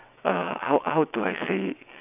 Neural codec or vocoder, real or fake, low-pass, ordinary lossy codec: vocoder, 22.05 kHz, 80 mel bands, WaveNeXt; fake; 3.6 kHz; none